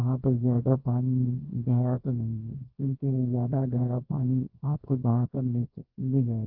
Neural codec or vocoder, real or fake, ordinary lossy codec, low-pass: codec, 24 kHz, 0.9 kbps, WavTokenizer, small release; fake; Opus, 32 kbps; 5.4 kHz